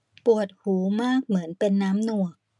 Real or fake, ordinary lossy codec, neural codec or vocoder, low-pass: real; none; none; 10.8 kHz